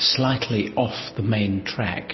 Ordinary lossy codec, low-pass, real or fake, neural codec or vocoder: MP3, 24 kbps; 7.2 kHz; real; none